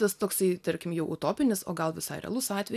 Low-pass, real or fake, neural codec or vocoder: 14.4 kHz; real; none